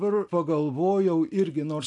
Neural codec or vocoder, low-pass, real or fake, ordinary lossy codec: none; 10.8 kHz; real; AAC, 64 kbps